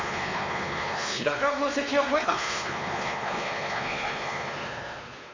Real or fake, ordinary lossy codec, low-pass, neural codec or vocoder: fake; MP3, 48 kbps; 7.2 kHz; codec, 16 kHz, 2 kbps, X-Codec, WavLM features, trained on Multilingual LibriSpeech